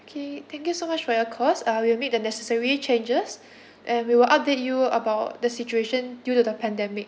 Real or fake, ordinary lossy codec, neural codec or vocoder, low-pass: real; none; none; none